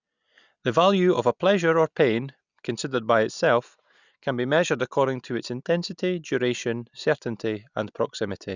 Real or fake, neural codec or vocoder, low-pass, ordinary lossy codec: real; none; 7.2 kHz; none